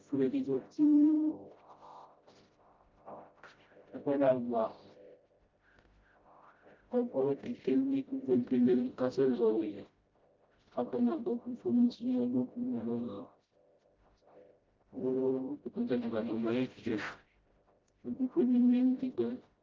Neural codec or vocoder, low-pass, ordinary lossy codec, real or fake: codec, 16 kHz, 0.5 kbps, FreqCodec, smaller model; 7.2 kHz; Opus, 32 kbps; fake